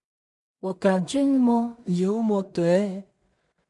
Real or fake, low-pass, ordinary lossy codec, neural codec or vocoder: fake; 10.8 kHz; MP3, 64 kbps; codec, 16 kHz in and 24 kHz out, 0.4 kbps, LongCat-Audio-Codec, two codebook decoder